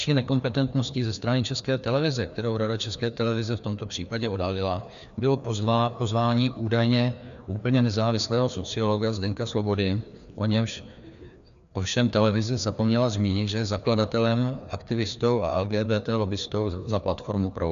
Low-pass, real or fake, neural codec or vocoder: 7.2 kHz; fake; codec, 16 kHz, 2 kbps, FreqCodec, larger model